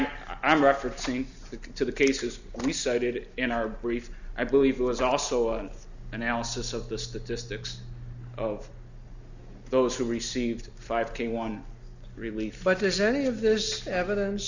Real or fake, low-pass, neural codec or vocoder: real; 7.2 kHz; none